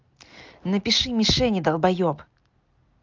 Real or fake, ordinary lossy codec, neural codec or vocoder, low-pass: real; Opus, 24 kbps; none; 7.2 kHz